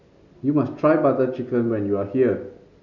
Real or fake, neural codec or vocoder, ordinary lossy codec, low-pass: real; none; none; 7.2 kHz